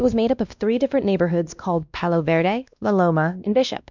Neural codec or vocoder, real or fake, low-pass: codec, 16 kHz, 1 kbps, X-Codec, WavLM features, trained on Multilingual LibriSpeech; fake; 7.2 kHz